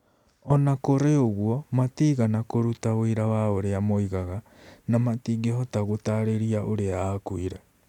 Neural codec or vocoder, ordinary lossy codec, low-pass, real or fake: none; none; 19.8 kHz; real